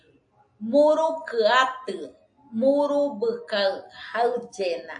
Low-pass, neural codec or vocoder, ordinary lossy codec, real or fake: 9.9 kHz; none; AAC, 64 kbps; real